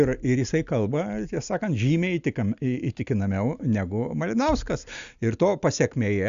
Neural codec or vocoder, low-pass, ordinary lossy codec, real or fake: none; 7.2 kHz; Opus, 64 kbps; real